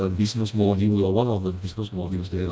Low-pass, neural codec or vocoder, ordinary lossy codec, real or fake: none; codec, 16 kHz, 1 kbps, FreqCodec, smaller model; none; fake